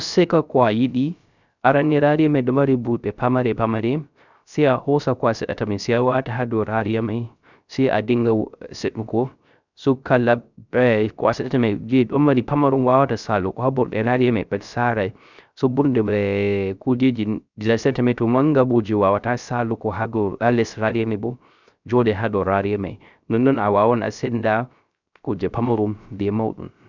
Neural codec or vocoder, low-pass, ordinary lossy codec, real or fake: codec, 16 kHz, 0.3 kbps, FocalCodec; 7.2 kHz; none; fake